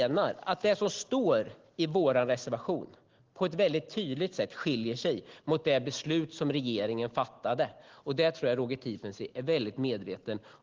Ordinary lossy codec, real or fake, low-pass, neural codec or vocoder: Opus, 16 kbps; real; 7.2 kHz; none